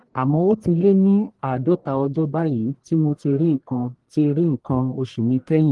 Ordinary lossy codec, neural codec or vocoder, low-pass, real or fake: Opus, 24 kbps; codec, 44.1 kHz, 1.7 kbps, Pupu-Codec; 10.8 kHz; fake